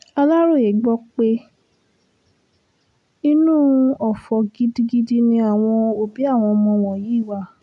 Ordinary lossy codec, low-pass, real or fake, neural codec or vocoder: none; 10.8 kHz; real; none